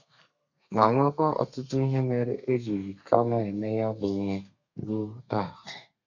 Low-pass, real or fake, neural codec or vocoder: 7.2 kHz; fake; codec, 32 kHz, 1.9 kbps, SNAC